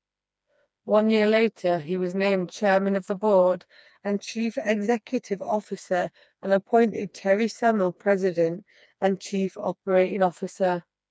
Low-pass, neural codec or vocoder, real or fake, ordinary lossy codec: none; codec, 16 kHz, 2 kbps, FreqCodec, smaller model; fake; none